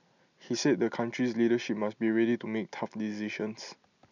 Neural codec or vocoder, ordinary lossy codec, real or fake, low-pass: none; none; real; 7.2 kHz